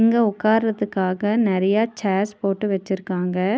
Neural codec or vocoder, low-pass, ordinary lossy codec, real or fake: none; none; none; real